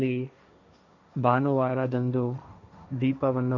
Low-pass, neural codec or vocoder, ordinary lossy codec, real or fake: 7.2 kHz; codec, 16 kHz, 1.1 kbps, Voila-Tokenizer; none; fake